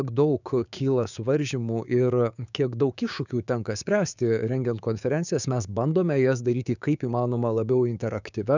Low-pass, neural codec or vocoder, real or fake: 7.2 kHz; codec, 16 kHz, 6 kbps, DAC; fake